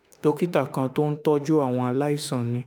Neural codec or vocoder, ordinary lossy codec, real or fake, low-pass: autoencoder, 48 kHz, 32 numbers a frame, DAC-VAE, trained on Japanese speech; none; fake; none